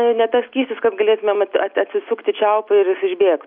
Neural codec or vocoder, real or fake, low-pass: none; real; 5.4 kHz